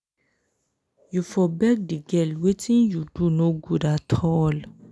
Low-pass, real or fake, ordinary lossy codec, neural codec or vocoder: none; real; none; none